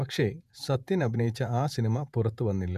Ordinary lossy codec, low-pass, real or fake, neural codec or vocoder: none; 14.4 kHz; fake; vocoder, 48 kHz, 128 mel bands, Vocos